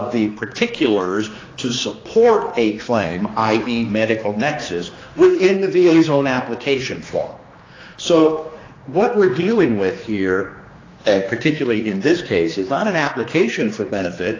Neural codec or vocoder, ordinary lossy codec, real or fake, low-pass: codec, 16 kHz, 2 kbps, X-Codec, HuBERT features, trained on general audio; AAC, 32 kbps; fake; 7.2 kHz